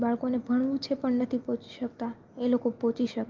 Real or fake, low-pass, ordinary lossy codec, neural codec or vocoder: real; 7.2 kHz; Opus, 32 kbps; none